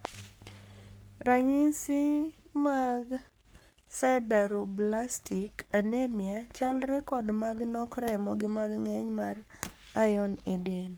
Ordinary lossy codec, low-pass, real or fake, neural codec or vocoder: none; none; fake; codec, 44.1 kHz, 3.4 kbps, Pupu-Codec